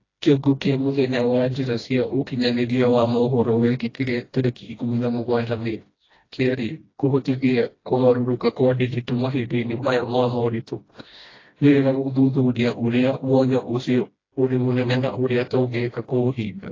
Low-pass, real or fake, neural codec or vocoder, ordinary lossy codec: 7.2 kHz; fake; codec, 16 kHz, 1 kbps, FreqCodec, smaller model; AAC, 32 kbps